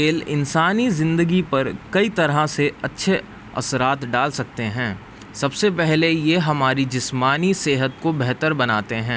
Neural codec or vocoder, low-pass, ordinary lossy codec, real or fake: none; none; none; real